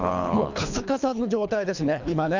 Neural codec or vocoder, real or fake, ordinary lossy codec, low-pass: codec, 24 kHz, 3 kbps, HILCodec; fake; none; 7.2 kHz